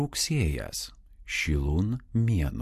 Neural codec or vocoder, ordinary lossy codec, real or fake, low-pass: none; MP3, 64 kbps; real; 14.4 kHz